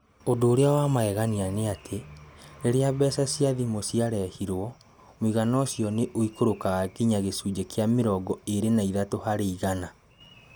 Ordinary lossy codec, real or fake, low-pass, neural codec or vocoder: none; real; none; none